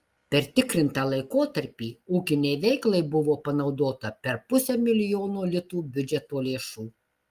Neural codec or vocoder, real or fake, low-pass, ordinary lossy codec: none; real; 14.4 kHz; Opus, 32 kbps